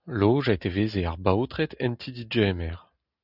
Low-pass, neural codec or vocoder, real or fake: 5.4 kHz; none; real